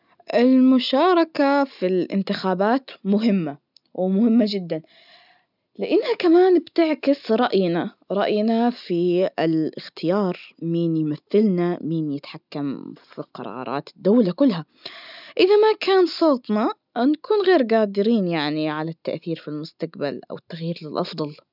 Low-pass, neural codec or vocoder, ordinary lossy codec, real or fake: 5.4 kHz; none; none; real